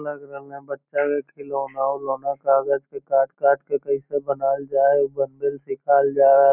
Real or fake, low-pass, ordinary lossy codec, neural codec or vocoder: real; 3.6 kHz; none; none